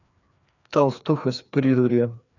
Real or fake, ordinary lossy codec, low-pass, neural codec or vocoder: fake; none; 7.2 kHz; codec, 16 kHz, 2 kbps, FreqCodec, larger model